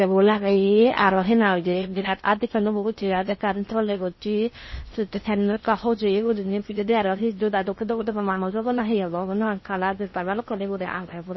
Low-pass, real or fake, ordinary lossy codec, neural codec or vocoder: 7.2 kHz; fake; MP3, 24 kbps; codec, 16 kHz in and 24 kHz out, 0.6 kbps, FocalCodec, streaming, 4096 codes